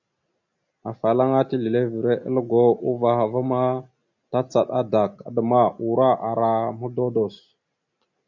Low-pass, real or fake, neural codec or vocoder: 7.2 kHz; real; none